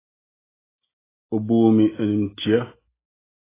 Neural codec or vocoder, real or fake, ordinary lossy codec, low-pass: none; real; AAC, 16 kbps; 3.6 kHz